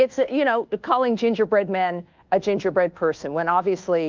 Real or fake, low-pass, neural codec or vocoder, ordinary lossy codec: fake; 7.2 kHz; codec, 24 kHz, 1.2 kbps, DualCodec; Opus, 24 kbps